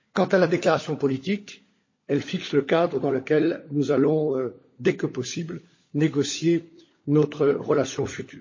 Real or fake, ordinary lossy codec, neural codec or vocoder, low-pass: fake; MP3, 32 kbps; codec, 16 kHz, 16 kbps, FunCodec, trained on LibriTTS, 50 frames a second; 7.2 kHz